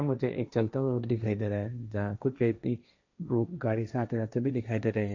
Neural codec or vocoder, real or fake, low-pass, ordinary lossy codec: codec, 16 kHz, 1.1 kbps, Voila-Tokenizer; fake; 7.2 kHz; none